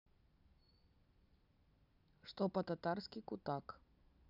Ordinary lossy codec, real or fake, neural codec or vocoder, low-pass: none; real; none; 5.4 kHz